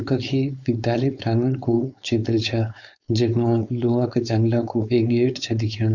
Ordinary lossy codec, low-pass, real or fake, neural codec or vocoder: none; 7.2 kHz; fake; codec, 16 kHz, 4.8 kbps, FACodec